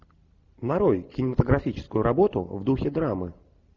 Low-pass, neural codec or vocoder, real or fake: 7.2 kHz; none; real